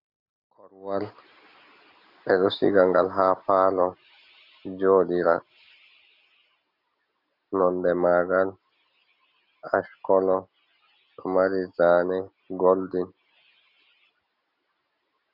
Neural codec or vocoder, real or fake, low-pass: none; real; 5.4 kHz